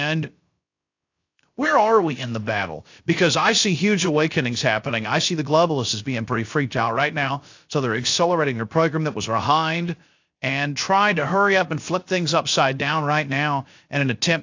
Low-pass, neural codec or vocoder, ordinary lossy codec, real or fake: 7.2 kHz; codec, 16 kHz, 0.3 kbps, FocalCodec; AAC, 48 kbps; fake